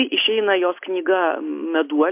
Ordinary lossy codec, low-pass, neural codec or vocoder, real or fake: MP3, 32 kbps; 3.6 kHz; none; real